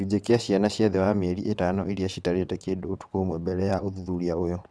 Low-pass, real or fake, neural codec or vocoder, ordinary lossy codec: none; fake; vocoder, 22.05 kHz, 80 mel bands, WaveNeXt; none